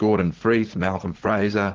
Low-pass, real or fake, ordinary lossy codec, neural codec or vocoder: 7.2 kHz; fake; Opus, 16 kbps; vocoder, 44.1 kHz, 80 mel bands, Vocos